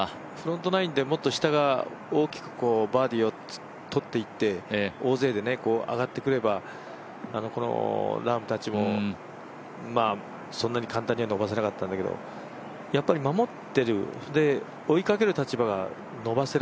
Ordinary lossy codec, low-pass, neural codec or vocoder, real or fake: none; none; none; real